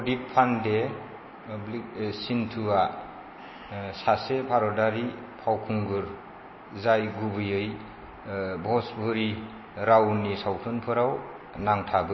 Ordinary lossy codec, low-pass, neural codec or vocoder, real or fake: MP3, 24 kbps; 7.2 kHz; none; real